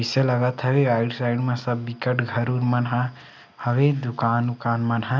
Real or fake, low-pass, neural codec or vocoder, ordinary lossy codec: real; none; none; none